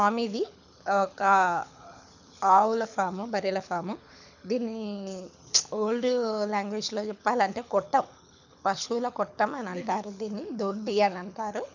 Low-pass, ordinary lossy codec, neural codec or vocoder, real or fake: 7.2 kHz; none; codec, 24 kHz, 6 kbps, HILCodec; fake